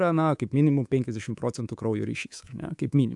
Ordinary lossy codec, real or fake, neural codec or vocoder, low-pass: AAC, 64 kbps; fake; codec, 24 kHz, 3.1 kbps, DualCodec; 10.8 kHz